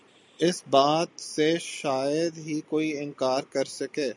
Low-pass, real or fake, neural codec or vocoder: 10.8 kHz; real; none